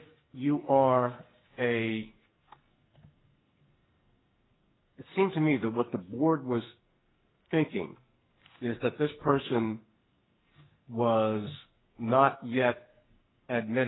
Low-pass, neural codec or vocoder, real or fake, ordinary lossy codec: 7.2 kHz; codec, 44.1 kHz, 2.6 kbps, SNAC; fake; AAC, 16 kbps